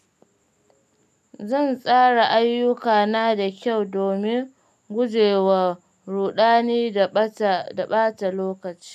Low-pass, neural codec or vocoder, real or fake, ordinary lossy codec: 14.4 kHz; none; real; none